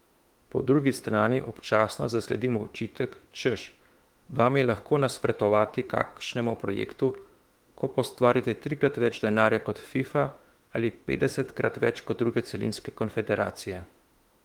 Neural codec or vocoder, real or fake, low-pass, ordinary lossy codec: autoencoder, 48 kHz, 32 numbers a frame, DAC-VAE, trained on Japanese speech; fake; 19.8 kHz; Opus, 24 kbps